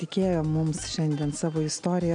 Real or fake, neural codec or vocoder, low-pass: real; none; 9.9 kHz